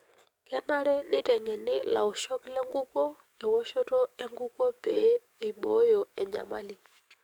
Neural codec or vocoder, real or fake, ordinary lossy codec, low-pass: codec, 44.1 kHz, 7.8 kbps, DAC; fake; none; 19.8 kHz